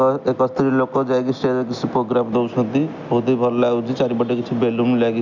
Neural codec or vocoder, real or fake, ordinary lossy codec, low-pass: none; real; none; 7.2 kHz